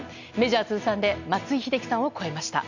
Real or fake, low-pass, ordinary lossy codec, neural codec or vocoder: real; 7.2 kHz; none; none